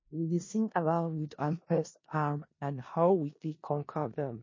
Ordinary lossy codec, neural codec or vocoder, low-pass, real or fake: MP3, 32 kbps; codec, 16 kHz in and 24 kHz out, 0.4 kbps, LongCat-Audio-Codec, four codebook decoder; 7.2 kHz; fake